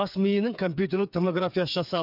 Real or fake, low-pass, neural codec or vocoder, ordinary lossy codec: fake; 5.4 kHz; codec, 16 kHz, 8 kbps, FreqCodec, smaller model; none